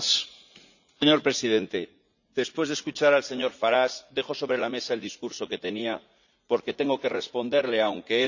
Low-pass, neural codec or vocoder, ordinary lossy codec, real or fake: 7.2 kHz; vocoder, 44.1 kHz, 80 mel bands, Vocos; none; fake